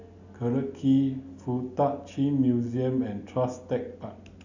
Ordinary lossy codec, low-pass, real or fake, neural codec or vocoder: none; 7.2 kHz; real; none